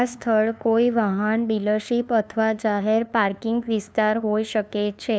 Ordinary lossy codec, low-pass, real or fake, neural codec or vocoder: none; none; fake; codec, 16 kHz, 2 kbps, FunCodec, trained on LibriTTS, 25 frames a second